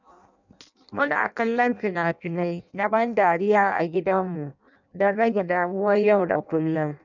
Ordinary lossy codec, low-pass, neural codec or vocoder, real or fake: none; 7.2 kHz; codec, 16 kHz in and 24 kHz out, 0.6 kbps, FireRedTTS-2 codec; fake